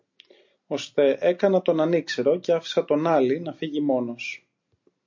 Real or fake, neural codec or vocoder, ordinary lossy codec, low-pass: real; none; MP3, 48 kbps; 7.2 kHz